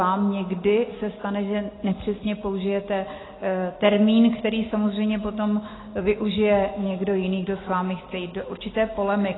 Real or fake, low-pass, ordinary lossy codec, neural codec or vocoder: real; 7.2 kHz; AAC, 16 kbps; none